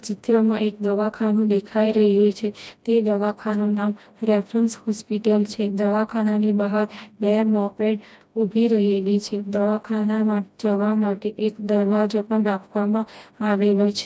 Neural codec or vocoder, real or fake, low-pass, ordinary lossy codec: codec, 16 kHz, 1 kbps, FreqCodec, smaller model; fake; none; none